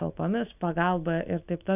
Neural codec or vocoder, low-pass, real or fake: none; 3.6 kHz; real